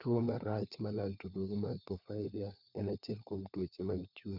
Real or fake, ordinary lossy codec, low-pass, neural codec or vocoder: fake; none; 5.4 kHz; codec, 16 kHz, 4 kbps, FunCodec, trained on LibriTTS, 50 frames a second